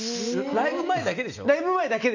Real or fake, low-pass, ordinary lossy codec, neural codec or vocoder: real; 7.2 kHz; none; none